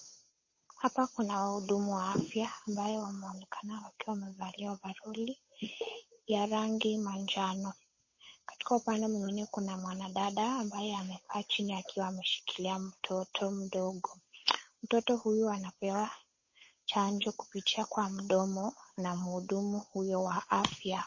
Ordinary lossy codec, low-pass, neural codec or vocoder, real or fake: MP3, 32 kbps; 7.2 kHz; codec, 16 kHz, 8 kbps, FunCodec, trained on Chinese and English, 25 frames a second; fake